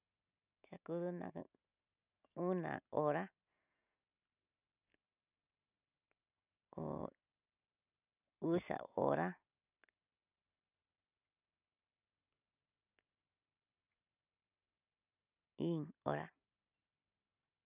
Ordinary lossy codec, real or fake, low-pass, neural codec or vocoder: none; real; 3.6 kHz; none